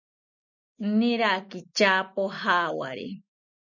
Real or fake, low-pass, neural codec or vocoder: real; 7.2 kHz; none